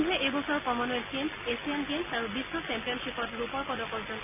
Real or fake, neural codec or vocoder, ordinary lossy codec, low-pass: real; none; none; 3.6 kHz